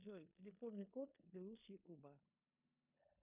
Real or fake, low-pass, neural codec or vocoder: fake; 3.6 kHz; codec, 16 kHz in and 24 kHz out, 0.9 kbps, LongCat-Audio-Codec, four codebook decoder